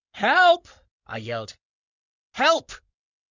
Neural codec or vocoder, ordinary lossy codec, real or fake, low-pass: codec, 16 kHz in and 24 kHz out, 2.2 kbps, FireRedTTS-2 codec; Opus, 64 kbps; fake; 7.2 kHz